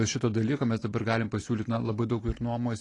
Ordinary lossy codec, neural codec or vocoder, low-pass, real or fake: AAC, 32 kbps; none; 10.8 kHz; real